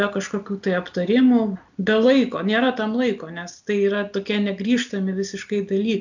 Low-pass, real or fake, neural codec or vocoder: 7.2 kHz; real; none